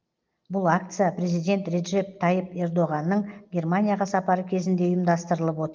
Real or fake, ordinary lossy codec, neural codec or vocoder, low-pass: real; Opus, 32 kbps; none; 7.2 kHz